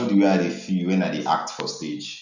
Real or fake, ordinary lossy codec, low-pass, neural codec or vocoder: real; none; 7.2 kHz; none